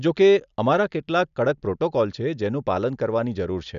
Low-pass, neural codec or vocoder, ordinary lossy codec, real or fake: 7.2 kHz; none; none; real